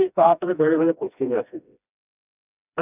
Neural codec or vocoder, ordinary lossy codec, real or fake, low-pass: codec, 16 kHz, 1 kbps, FreqCodec, smaller model; none; fake; 3.6 kHz